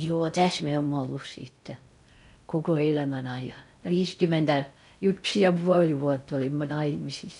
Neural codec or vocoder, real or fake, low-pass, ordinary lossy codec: codec, 16 kHz in and 24 kHz out, 0.6 kbps, FocalCodec, streaming, 4096 codes; fake; 10.8 kHz; none